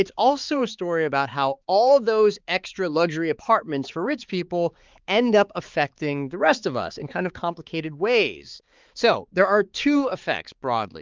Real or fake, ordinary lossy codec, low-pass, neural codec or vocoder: fake; Opus, 24 kbps; 7.2 kHz; codec, 16 kHz, 4 kbps, X-Codec, HuBERT features, trained on balanced general audio